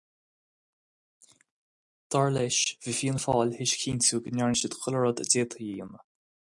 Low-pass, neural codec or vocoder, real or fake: 10.8 kHz; none; real